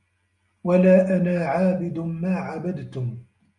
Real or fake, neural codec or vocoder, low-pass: real; none; 10.8 kHz